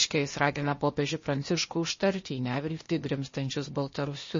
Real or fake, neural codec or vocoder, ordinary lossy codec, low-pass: fake; codec, 16 kHz, 0.8 kbps, ZipCodec; MP3, 32 kbps; 7.2 kHz